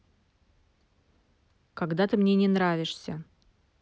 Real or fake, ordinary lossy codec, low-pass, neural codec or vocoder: real; none; none; none